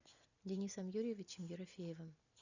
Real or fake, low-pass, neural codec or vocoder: real; 7.2 kHz; none